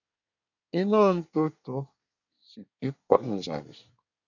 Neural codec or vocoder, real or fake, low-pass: codec, 24 kHz, 1 kbps, SNAC; fake; 7.2 kHz